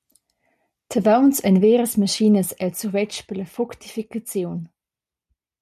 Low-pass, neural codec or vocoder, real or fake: 14.4 kHz; none; real